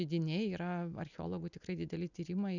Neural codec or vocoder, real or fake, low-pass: none; real; 7.2 kHz